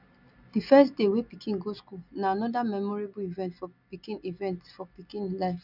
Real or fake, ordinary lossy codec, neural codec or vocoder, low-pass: real; none; none; 5.4 kHz